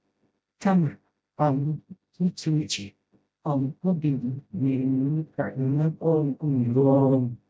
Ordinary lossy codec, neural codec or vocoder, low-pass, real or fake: none; codec, 16 kHz, 0.5 kbps, FreqCodec, smaller model; none; fake